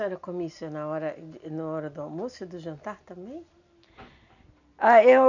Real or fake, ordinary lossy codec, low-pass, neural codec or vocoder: real; none; 7.2 kHz; none